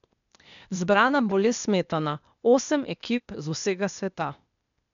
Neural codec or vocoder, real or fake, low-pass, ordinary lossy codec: codec, 16 kHz, 0.8 kbps, ZipCodec; fake; 7.2 kHz; MP3, 96 kbps